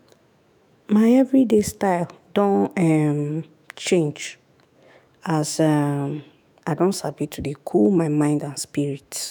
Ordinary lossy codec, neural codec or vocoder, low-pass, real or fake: none; autoencoder, 48 kHz, 128 numbers a frame, DAC-VAE, trained on Japanese speech; none; fake